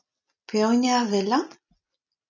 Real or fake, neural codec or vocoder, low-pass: real; none; 7.2 kHz